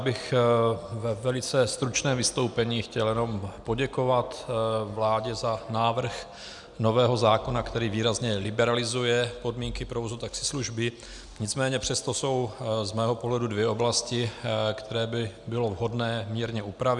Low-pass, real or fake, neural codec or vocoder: 10.8 kHz; real; none